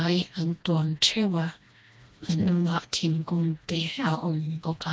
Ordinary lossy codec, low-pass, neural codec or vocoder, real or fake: none; none; codec, 16 kHz, 1 kbps, FreqCodec, smaller model; fake